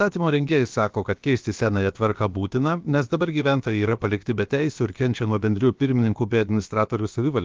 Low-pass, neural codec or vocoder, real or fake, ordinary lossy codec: 7.2 kHz; codec, 16 kHz, about 1 kbps, DyCAST, with the encoder's durations; fake; Opus, 32 kbps